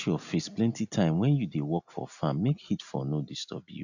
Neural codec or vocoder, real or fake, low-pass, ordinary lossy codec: none; real; 7.2 kHz; none